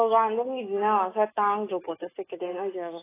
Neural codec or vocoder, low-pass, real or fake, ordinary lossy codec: autoencoder, 48 kHz, 128 numbers a frame, DAC-VAE, trained on Japanese speech; 3.6 kHz; fake; AAC, 16 kbps